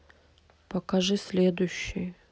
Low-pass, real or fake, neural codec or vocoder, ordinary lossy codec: none; real; none; none